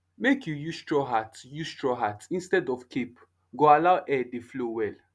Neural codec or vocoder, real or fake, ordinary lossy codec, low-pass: none; real; none; none